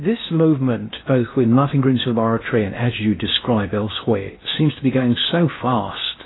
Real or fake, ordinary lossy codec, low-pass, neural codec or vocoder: fake; AAC, 16 kbps; 7.2 kHz; codec, 16 kHz in and 24 kHz out, 0.6 kbps, FocalCodec, streaming, 2048 codes